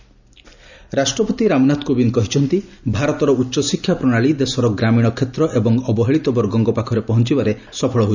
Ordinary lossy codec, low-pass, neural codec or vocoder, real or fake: none; 7.2 kHz; none; real